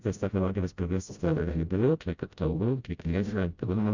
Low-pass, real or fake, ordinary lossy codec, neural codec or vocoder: 7.2 kHz; fake; Opus, 64 kbps; codec, 16 kHz, 0.5 kbps, FreqCodec, smaller model